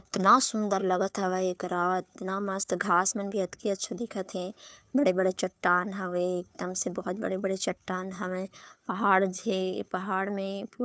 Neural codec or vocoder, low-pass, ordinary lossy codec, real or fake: codec, 16 kHz, 2 kbps, FunCodec, trained on Chinese and English, 25 frames a second; none; none; fake